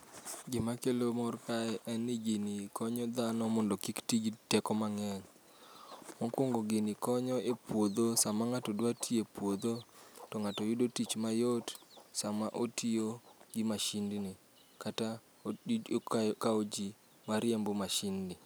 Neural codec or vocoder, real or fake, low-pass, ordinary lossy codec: none; real; none; none